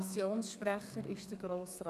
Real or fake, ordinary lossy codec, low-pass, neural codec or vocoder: fake; none; 14.4 kHz; codec, 32 kHz, 1.9 kbps, SNAC